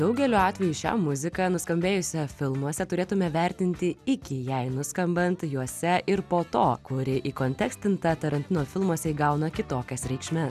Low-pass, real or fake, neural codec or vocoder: 14.4 kHz; real; none